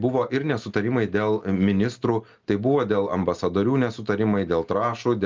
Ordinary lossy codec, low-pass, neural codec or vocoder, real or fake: Opus, 24 kbps; 7.2 kHz; none; real